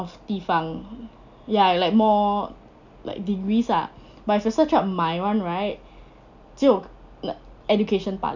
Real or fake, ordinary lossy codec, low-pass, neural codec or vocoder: real; none; 7.2 kHz; none